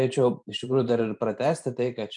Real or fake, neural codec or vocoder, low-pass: fake; vocoder, 44.1 kHz, 128 mel bands every 512 samples, BigVGAN v2; 10.8 kHz